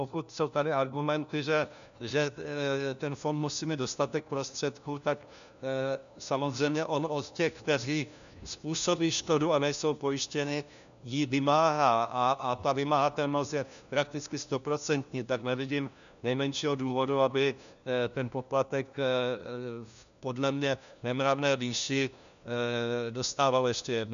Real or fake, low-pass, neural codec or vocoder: fake; 7.2 kHz; codec, 16 kHz, 1 kbps, FunCodec, trained on LibriTTS, 50 frames a second